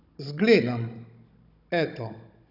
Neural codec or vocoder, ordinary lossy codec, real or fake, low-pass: codec, 16 kHz, 16 kbps, FunCodec, trained on Chinese and English, 50 frames a second; none; fake; 5.4 kHz